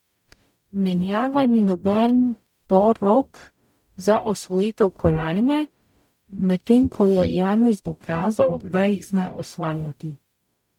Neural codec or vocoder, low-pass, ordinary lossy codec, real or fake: codec, 44.1 kHz, 0.9 kbps, DAC; 19.8 kHz; MP3, 96 kbps; fake